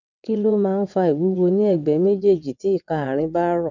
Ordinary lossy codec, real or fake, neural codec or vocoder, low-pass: none; fake; vocoder, 22.05 kHz, 80 mel bands, WaveNeXt; 7.2 kHz